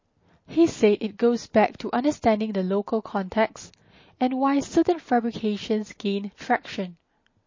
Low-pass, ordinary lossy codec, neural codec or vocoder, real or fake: 7.2 kHz; MP3, 32 kbps; none; real